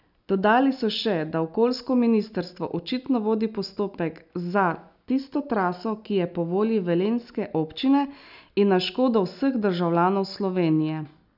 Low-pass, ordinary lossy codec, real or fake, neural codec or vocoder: 5.4 kHz; none; real; none